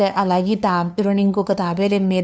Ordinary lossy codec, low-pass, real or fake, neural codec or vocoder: none; none; fake; codec, 16 kHz, 2 kbps, FunCodec, trained on LibriTTS, 25 frames a second